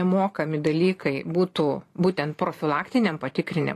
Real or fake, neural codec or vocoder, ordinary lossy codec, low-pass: fake; vocoder, 44.1 kHz, 128 mel bands every 512 samples, BigVGAN v2; AAC, 48 kbps; 14.4 kHz